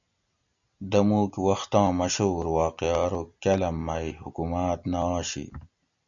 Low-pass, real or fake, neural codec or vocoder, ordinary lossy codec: 7.2 kHz; real; none; MP3, 96 kbps